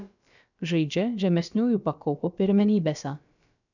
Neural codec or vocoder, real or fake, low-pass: codec, 16 kHz, about 1 kbps, DyCAST, with the encoder's durations; fake; 7.2 kHz